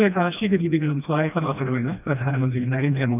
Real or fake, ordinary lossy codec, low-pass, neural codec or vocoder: fake; none; 3.6 kHz; codec, 16 kHz, 1 kbps, FreqCodec, smaller model